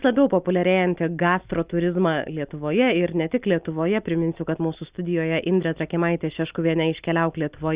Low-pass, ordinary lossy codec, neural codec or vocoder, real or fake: 3.6 kHz; Opus, 64 kbps; none; real